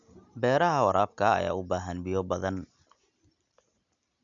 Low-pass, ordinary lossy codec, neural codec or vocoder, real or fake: 7.2 kHz; none; none; real